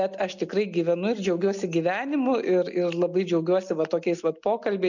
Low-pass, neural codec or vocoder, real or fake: 7.2 kHz; none; real